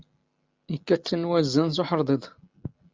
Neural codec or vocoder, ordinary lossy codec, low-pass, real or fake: none; Opus, 24 kbps; 7.2 kHz; real